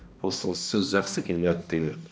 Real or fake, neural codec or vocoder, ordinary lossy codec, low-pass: fake; codec, 16 kHz, 1 kbps, X-Codec, HuBERT features, trained on balanced general audio; none; none